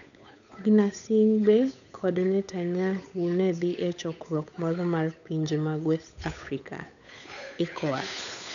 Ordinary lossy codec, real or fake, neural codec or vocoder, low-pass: none; fake; codec, 16 kHz, 8 kbps, FunCodec, trained on Chinese and English, 25 frames a second; 7.2 kHz